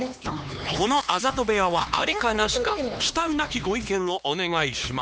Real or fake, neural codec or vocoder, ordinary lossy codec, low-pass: fake; codec, 16 kHz, 2 kbps, X-Codec, HuBERT features, trained on LibriSpeech; none; none